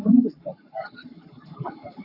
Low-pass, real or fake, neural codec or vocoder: 5.4 kHz; fake; codec, 44.1 kHz, 7.8 kbps, Pupu-Codec